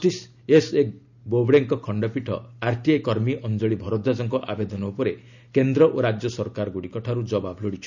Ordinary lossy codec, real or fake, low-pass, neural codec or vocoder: none; real; 7.2 kHz; none